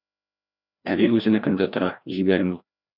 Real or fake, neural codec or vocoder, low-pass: fake; codec, 16 kHz, 1 kbps, FreqCodec, larger model; 5.4 kHz